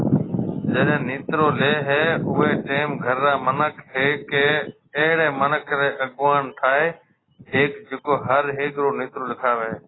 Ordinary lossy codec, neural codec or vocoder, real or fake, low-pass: AAC, 16 kbps; none; real; 7.2 kHz